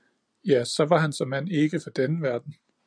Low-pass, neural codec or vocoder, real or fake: 9.9 kHz; none; real